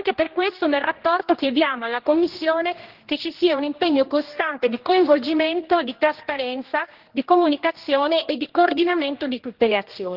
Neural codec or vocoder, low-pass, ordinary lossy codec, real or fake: codec, 16 kHz, 1 kbps, X-Codec, HuBERT features, trained on general audio; 5.4 kHz; Opus, 16 kbps; fake